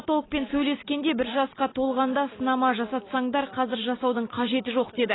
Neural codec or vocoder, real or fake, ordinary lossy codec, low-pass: none; real; AAC, 16 kbps; 7.2 kHz